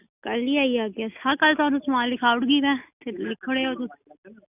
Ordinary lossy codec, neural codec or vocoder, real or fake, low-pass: none; none; real; 3.6 kHz